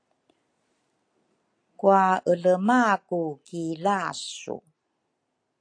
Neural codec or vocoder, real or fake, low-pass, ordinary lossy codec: none; real; 9.9 kHz; AAC, 48 kbps